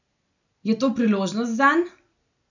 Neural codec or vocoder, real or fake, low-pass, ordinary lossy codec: none; real; 7.2 kHz; none